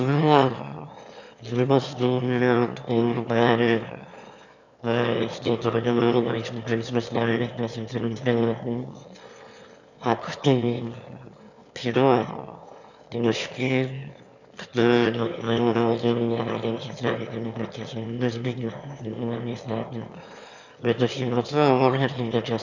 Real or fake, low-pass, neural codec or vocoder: fake; 7.2 kHz; autoencoder, 22.05 kHz, a latent of 192 numbers a frame, VITS, trained on one speaker